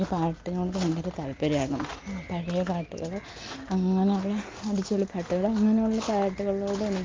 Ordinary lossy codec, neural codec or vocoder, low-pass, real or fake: Opus, 24 kbps; none; 7.2 kHz; real